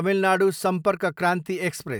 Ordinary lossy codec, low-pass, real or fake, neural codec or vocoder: none; none; real; none